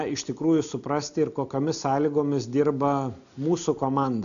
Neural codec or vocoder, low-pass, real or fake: none; 7.2 kHz; real